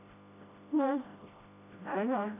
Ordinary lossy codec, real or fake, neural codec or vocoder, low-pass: AAC, 24 kbps; fake; codec, 16 kHz, 0.5 kbps, FreqCodec, smaller model; 3.6 kHz